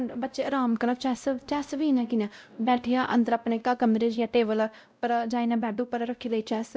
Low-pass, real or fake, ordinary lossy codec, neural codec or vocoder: none; fake; none; codec, 16 kHz, 0.5 kbps, X-Codec, WavLM features, trained on Multilingual LibriSpeech